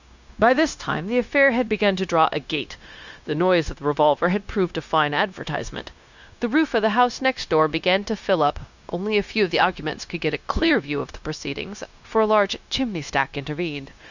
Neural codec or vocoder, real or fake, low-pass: codec, 16 kHz, 0.9 kbps, LongCat-Audio-Codec; fake; 7.2 kHz